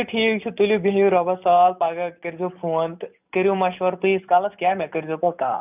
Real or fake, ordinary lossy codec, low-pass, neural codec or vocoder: real; none; 3.6 kHz; none